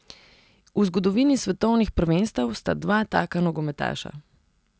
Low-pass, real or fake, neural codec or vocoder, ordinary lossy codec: none; real; none; none